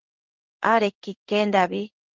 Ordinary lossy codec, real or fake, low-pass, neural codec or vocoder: Opus, 16 kbps; fake; 7.2 kHz; codec, 16 kHz in and 24 kHz out, 1 kbps, XY-Tokenizer